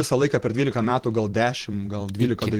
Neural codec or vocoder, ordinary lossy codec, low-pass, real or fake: vocoder, 44.1 kHz, 128 mel bands every 512 samples, BigVGAN v2; Opus, 16 kbps; 19.8 kHz; fake